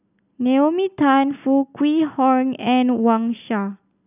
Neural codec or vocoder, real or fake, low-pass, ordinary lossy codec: none; real; 3.6 kHz; none